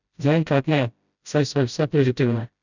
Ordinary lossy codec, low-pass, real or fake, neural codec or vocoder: none; 7.2 kHz; fake; codec, 16 kHz, 0.5 kbps, FreqCodec, smaller model